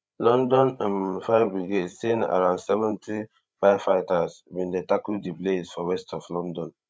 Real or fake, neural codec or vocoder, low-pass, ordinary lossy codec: fake; codec, 16 kHz, 8 kbps, FreqCodec, larger model; none; none